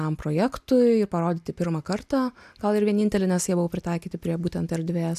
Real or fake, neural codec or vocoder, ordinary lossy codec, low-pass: real; none; AAC, 96 kbps; 14.4 kHz